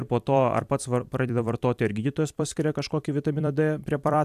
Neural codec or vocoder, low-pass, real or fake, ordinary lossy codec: vocoder, 44.1 kHz, 128 mel bands every 256 samples, BigVGAN v2; 14.4 kHz; fake; AAC, 96 kbps